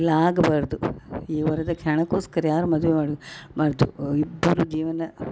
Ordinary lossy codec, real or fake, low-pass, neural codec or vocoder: none; real; none; none